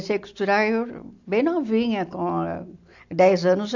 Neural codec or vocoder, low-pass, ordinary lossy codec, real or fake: none; 7.2 kHz; AAC, 48 kbps; real